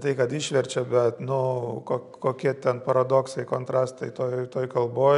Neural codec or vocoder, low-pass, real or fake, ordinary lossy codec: none; 10.8 kHz; real; MP3, 96 kbps